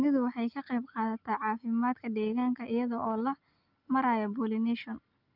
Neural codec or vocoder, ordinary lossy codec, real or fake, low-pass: none; Opus, 32 kbps; real; 5.4 kHz